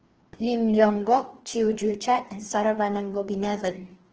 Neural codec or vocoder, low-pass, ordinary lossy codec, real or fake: codec, 16 kHz, 2 kbps, FreqCodec, larger model; 7.2 kHz; Opus, 16 kbps; fake